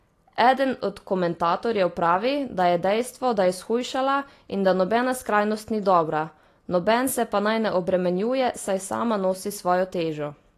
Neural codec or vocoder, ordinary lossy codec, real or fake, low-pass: none; AAC, 48 kbps; real; 14.4 kHz